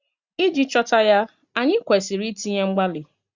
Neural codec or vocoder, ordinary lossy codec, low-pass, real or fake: none; Opus, 64 kbps; 7.2 kHz; real